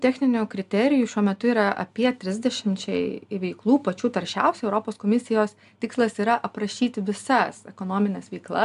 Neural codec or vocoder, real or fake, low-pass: none; real; 10.8 kHz